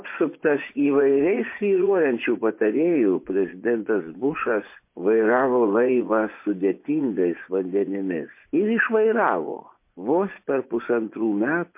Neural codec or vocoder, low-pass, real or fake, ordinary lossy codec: vocoder, 24 kHz, 100 mel bands, Vocos; 3.6 kHz; fake; MP3, 24 kbps